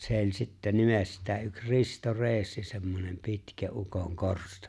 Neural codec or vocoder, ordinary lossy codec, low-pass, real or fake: none; none; none; real